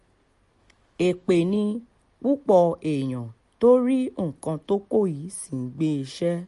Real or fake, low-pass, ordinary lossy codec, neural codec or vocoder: real; 14.4 kHz; MP3, 48 kbps; none